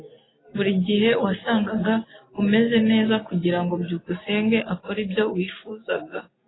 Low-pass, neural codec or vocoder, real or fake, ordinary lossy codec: 7.2 kHz; none; real; AAC, 16 kbps